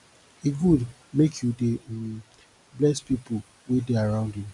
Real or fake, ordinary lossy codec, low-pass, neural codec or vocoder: real; none; 10.8 kHz; none